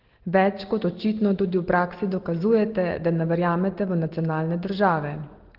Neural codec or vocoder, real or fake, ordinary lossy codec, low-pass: none; real; Opus, 16 kbps; 5.4 kHz